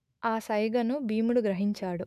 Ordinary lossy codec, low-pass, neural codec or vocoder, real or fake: none; 14.4 kHz; autoencoder, 48 kHz, 128 numbers a frame, DAC-VAE, trained on Japanese speech; fake